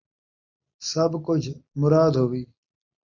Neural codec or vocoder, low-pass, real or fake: none; 7.2 kHz; real